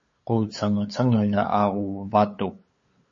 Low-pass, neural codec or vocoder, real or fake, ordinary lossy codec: 7.2 kHz; codec, 16 kHz, 8 kbps, FunCodec, trained on LibriTTS, 25 frames a second; fake; MP3, 32 kbps